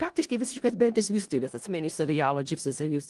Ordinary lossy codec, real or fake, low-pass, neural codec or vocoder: Opus, 24 kbps; fake; 10.8 kHz; codec, 16 kHz in and 24 kHz out, 0.4 kbps, LongCat-Audio-Codec, four codebook decoder